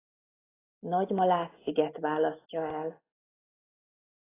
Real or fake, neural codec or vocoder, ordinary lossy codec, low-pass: real; none; AAC, 16 kbps; 3.6 kHz